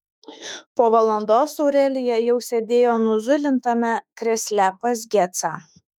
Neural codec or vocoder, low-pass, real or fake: autoencoder, 48 kHz, 32 numbers a frame, DAC-VAE, trained on Japanese speech; 19.8 kHz; fake